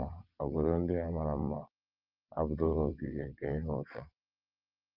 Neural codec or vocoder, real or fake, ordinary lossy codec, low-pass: vocoder, 22.05 kHz, 80 mel bands, WaveNeXt; fake; Opus, 24 kbps; 5.4 kHz